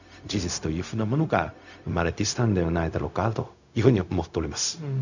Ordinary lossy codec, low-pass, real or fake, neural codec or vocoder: none; 7.2 kHz; fake; codec, 16 kHz, 0.4 kbps, LongCat-Audio-Codec